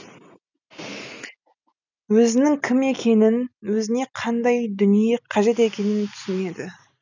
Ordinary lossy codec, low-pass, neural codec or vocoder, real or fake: none; 7.2 kHz; none; real